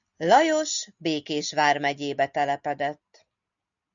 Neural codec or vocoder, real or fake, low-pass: none; real; 7.2 kHz